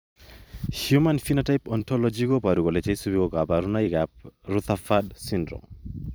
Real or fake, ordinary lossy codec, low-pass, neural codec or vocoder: fake; none; none; vocoder, 44.1 kHz, 128 mel bands every 256 samples, BigVGAN v2